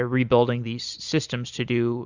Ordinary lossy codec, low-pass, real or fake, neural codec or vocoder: Opus, 64 kbps; 7.2 kHz; real; none